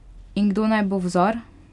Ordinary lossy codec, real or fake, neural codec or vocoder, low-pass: none; real; none; 10.8 kHz